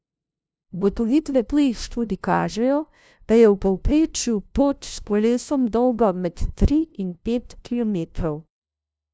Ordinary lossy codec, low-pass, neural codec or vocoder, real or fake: none; none; codec, 16 kHz, 0.5 kbps, FunCodec, trained on LibriTTS, 25 frames a second; fake